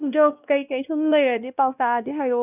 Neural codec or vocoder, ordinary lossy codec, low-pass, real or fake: codec, 16 kHz, 1 kbps, X-Codec, WavLM features, trained on Multilingual LibriSpeech; none; 3.6 kHz; fake